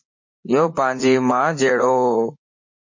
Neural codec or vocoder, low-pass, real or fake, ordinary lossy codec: vocoder, 44.1 kHz, 80 mel bands, Vocos; 7.2 kHz; fake; MP3, 32 kbps